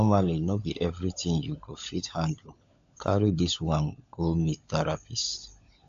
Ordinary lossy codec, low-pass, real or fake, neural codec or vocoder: AAC, 64 kbps; 7.2 kHz; fake; codec, 16 kHz, 16 kbps, FunCodec, trained on LibriTTS, 50 frames a second